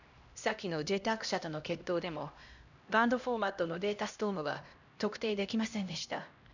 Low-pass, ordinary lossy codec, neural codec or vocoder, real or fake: 7.2 kHz; none; codec, 16 kHz, 1 kbps, X-Codec, HuBERT features, trained on LibriSpeech; fake